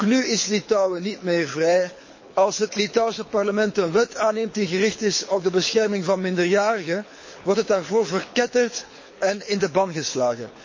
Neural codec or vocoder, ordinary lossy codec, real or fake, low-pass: codec, 24 kHz, 6 kbps, HILCodec; MP3, 32 kbps; fake; 7.2 kHz